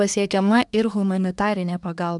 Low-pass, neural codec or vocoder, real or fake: 10.8 kHz; codec, 24 kHz, 1 kbps, SNAC; fake